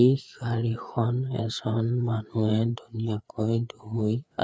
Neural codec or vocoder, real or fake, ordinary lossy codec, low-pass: codec, 16 kHz, 4 kbps, FreqCodec, larger model; fake; none; none